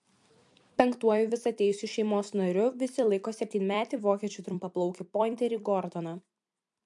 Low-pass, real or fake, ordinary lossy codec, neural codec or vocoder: 10.8 kHz; fake; MP3, 64 kbps; vocoder, 44.1 kHz, 128 mel bands every 256 samples, BigVGAN v2